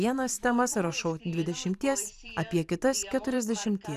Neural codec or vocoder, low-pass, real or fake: vocoder, 44.1 kHz, 128 mel bands every 512 samples, BigVGAN v2; 14.4 kHz; fake